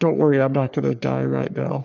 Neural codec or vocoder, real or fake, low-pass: codec, 44.1 kHz, 3.4 kbps, Pupu-Codec; fake; 7.2 kHz